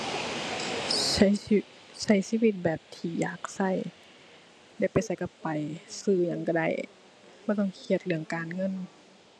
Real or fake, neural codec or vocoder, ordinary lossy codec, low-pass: real; none; none; none